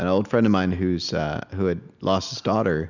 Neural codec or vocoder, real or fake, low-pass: none; real; 7.2 kHz